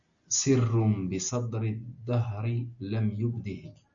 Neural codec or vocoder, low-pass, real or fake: none; 7.2 kHz; real